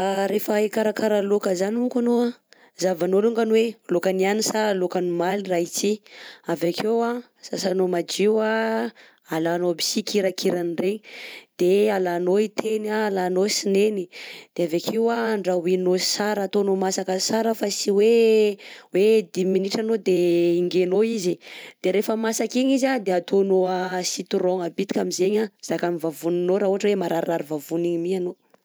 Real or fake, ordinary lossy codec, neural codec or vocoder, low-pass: fake; none; vocoder, 44.1 kHz, 128 mel bands every 512 samples, BigVGAN v2; none